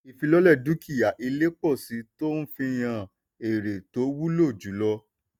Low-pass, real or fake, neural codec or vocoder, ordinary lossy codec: 19.8 kHz; real; none; none